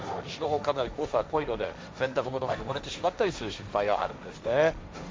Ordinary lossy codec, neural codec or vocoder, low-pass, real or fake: none; codec, 16 kHz, 1.1 kbps, Voila-Tokenizer; none; fake